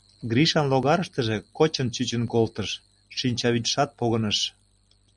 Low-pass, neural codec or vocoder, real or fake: 10.8 kHz; none; real